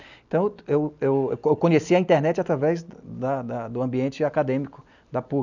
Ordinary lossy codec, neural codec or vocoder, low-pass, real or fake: none; none; 7.2 kHz; real